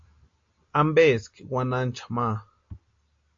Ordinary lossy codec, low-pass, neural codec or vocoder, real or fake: AAC, 48 kbps; 7.2 kHz; none; real